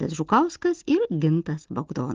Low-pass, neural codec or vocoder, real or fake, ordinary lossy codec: 7.2 kHz; none; real; Opus, 16 kbps